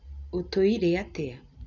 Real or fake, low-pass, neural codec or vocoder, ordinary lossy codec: real; 7.2 kHz; none; none